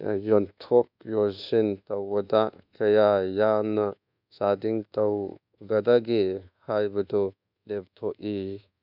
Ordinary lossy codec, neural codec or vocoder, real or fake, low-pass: none; codec, 24 kHz, 1.2 kbps, DualCodec; fake; 5.4 kHz